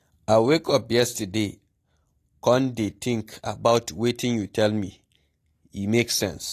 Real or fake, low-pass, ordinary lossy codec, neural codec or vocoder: real; 14.4 kHz; AAC, 48 kbps; none